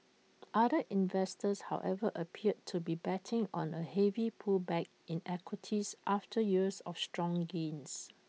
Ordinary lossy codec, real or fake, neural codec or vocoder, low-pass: none; real; none; none